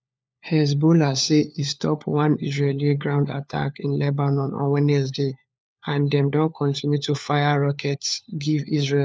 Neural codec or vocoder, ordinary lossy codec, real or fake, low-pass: codec, 16 kHz, 4 kbps, FunCodec, trained on LibriTTS, 50 frames a second; none; fake; none